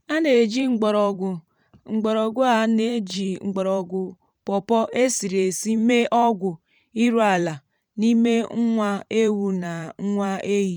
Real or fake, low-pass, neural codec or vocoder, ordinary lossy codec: fake; 19.8 kHz; vocoder, 44.1 kHz, 128 mel bands every 512 samples, BigVGAN v2; none